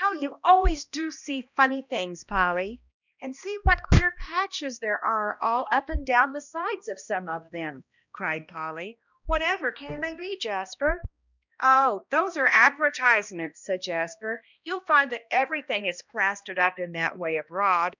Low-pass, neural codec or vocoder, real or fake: 7.2 kHz; codec, 16 kHz, 1 kbps, X-Codec, HuBERT features, trained on balanced general audio; fake